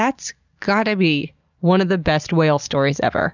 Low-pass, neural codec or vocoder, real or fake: 7.2 kHz; none; real